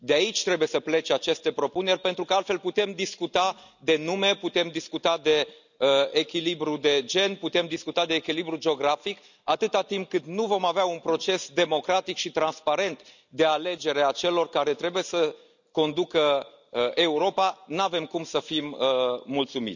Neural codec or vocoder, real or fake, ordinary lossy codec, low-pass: none; real; none; 7.2 kHz